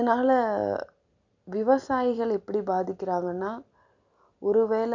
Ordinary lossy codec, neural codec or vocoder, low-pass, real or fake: none; none; 7.2 kHz; real